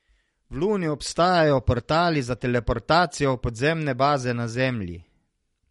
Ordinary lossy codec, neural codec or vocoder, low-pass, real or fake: MP3, 48 kbps; none; 19.8 kHz; real